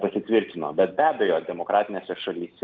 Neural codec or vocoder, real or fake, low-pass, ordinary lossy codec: none; real; 7.2 kHz; Opus, 32 kbps